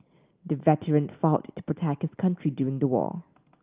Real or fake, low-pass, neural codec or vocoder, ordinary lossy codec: real; 3.6 kHz; none; Opus, 32 kbps